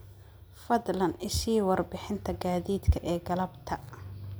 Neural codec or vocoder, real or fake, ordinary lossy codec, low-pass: none; real; none; none